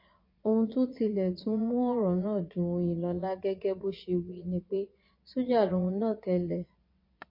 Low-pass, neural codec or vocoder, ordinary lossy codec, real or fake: 5.4 kHz; vocoder, 22.05 kHz, 80 mel bands, Vocos; MP3, 32 kbps; fake